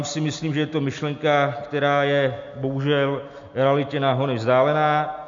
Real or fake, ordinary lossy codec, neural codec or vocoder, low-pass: real; MP3, 48 kbps; none; 7.2 kHz